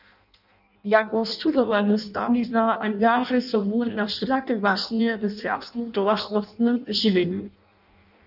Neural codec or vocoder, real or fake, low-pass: codec, 16 kHz in and 24 kHz out, 0.6 kbps, FireRedTTS-2 codec; fake; 5.4 kHz